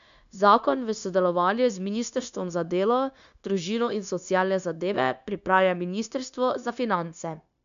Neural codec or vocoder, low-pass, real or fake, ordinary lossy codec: codec, 16 kHz, 0.9 kbps, LongCat-Audio-Codec; 7.2 kHz; fake; none